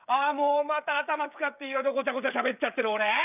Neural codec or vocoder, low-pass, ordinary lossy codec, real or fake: codec, 16 kHz, 16 kbps, FreqCodec, smaller model; 3.6 kHz; none; fake